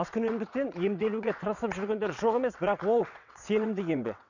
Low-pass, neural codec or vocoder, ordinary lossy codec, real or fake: 7.2 kHz; vocoder, 22.05 kHz, 80 mel bands, WaveNeXt; none; fake